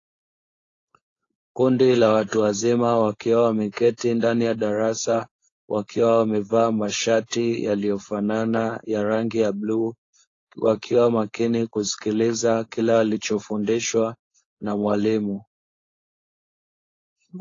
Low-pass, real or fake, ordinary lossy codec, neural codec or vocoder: 7.2 kHz; fake; AAC, 32 kbps; codec, 16 kHz, 4.8 kbps, FACodec